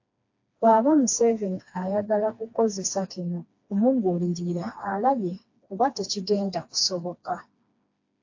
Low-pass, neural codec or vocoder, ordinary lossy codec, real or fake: 7.2 kHz; codec, 16 kHz, 2 kbps, FreqCodec, smaller model; AAC, 48 kbps; fake